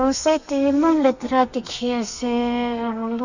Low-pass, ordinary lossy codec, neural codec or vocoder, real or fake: 7.2 kHz; none; codec, 32 kHz, 1.9 kbps, SNAC; fake